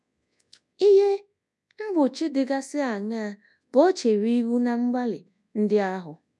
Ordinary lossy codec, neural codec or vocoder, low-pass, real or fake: none; codec, 24 kHz, 0.9 kbps, WavTokenizer, large speech release; none; fake